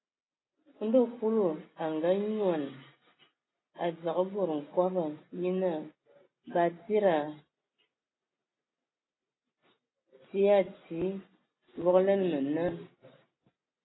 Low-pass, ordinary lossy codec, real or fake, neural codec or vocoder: 7.2 kHz; AAC, 16 kbps; real; none